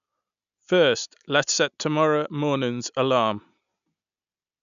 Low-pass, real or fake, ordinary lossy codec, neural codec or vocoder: 7.2 kHz; real; none; none